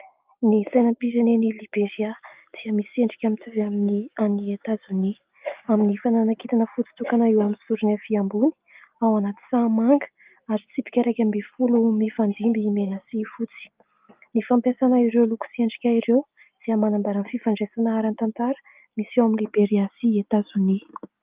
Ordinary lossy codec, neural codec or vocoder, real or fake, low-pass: Opus, 32 kbps; none; real; 3.6 kHz